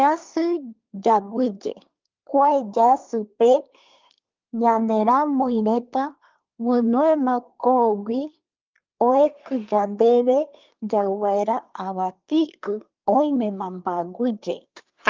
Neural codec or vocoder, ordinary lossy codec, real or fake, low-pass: codec, 24 kHz, 1 kbps, SNAC; Opus, 24 kbps; fake; 7.2 kHz